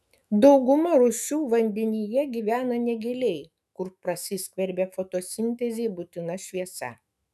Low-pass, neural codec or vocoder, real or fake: 14.4 kHz; autoencoder, 48 kHz, 128 numbers a frame, DAC-VAE, trained on Japanese speech; fake